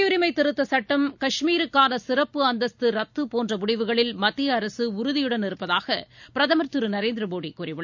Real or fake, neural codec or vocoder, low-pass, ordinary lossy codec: real; none; 7.2 kHz; none